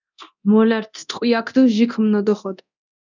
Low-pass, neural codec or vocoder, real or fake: 7.2 kHz; codec, 24 kHz, 0.9 kbps, DualCodec; fake